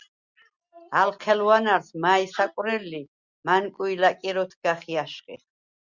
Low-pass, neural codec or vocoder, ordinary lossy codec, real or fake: 7.2 kHz; none; Opus, 64 kbps; real